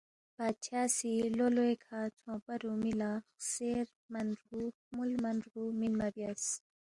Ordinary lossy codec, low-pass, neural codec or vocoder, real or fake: Opus, 64 kbps; 10.8 kHz; none; real